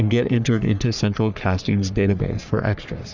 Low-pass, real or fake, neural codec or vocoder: 7.2 kHz; fake; codec, 44.1 kHz, 3.4 kbps, Pupu-Codec